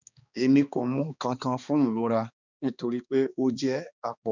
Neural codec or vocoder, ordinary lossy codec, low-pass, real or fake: codec, 16 kHz, 2 kbps, X-Codec, HuBERT features, trained on balanced general audio; none; 7.2 kHz; fake